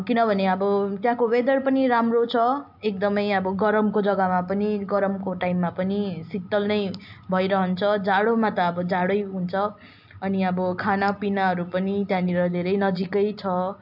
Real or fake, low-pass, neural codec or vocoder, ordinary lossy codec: real; 5.4 kHz; none; AAC, 48 kbps